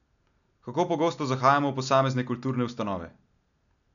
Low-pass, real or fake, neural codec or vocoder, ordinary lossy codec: 7.2 kHz; real; none; none